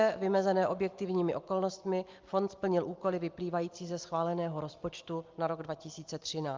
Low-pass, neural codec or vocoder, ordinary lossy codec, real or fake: 7.2 kHz; none; Opus, 24 kbps; real